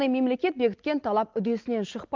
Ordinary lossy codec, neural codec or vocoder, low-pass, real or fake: Opus, 24 kbps; none; 7.2 kHz; real